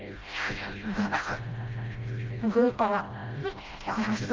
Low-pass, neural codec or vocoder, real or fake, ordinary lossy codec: 7.2 kHz; codec, 16 kHz, 0.5 kbps, FreqCodec, smaller model; fake; Opus, 24 kbps